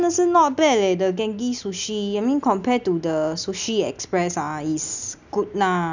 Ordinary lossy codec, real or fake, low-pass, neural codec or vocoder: none; real; 7.2 kHz; none